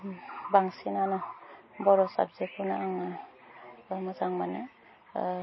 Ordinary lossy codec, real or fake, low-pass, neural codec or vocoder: MP3, 24 kbps; real; 7.2 kHz; none